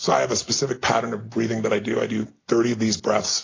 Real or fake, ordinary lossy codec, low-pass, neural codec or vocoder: real; AAC, 32 kbps; 7.2 kHz; none